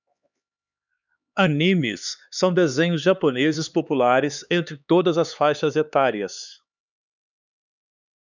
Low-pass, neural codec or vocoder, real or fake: 7.2 kHz; codec, 16 kHz, 4 kbps, X-Codec, HuBERT features, trained on LibriSpeech; fake